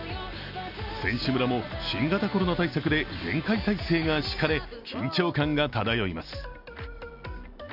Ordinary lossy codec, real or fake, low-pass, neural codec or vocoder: none; real; 5.4 kHz; none